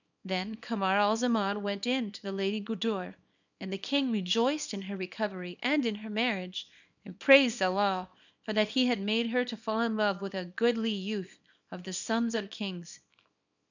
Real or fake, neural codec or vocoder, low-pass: fake; codec, 24 kHz, 0.9 kbps, WavTokenizer, small release; 7.2 kHz